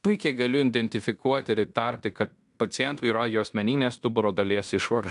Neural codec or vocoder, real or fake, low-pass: codec, 16 kHz in and 24 kHz out, 0.9 kbps, LongCat-Audio-Codec, fine tuned four codebook decoder; fake; 10.8 kHz